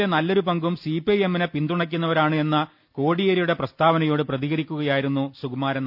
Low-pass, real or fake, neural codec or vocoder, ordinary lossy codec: 5.4 kHz; real; none; none